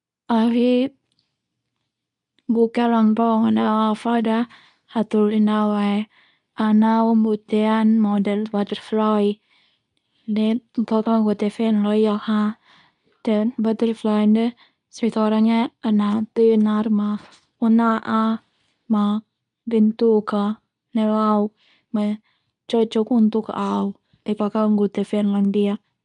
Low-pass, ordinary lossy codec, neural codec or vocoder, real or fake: 10.8 kHz; none; codec, 24 kHz, 0.9 kbps, WavTokenizer, medium speech release version 2; fake